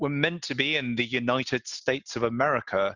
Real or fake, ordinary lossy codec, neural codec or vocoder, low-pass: real; Opus, 64 kbps; none; 7.2 kHz